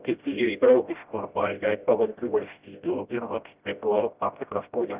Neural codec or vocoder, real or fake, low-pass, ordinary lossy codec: codec, 16 kHz, 0.5 kbps, FreqCodec, smaller model; fake; 3.6 kHz; Opus, 32 kbps